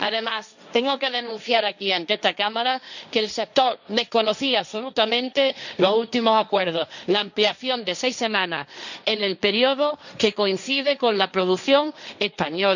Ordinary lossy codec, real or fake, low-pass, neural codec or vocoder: none; fake; 7.2 kHz; codec, 16 kHz, 1.1 kbps, Voila-Tokenizer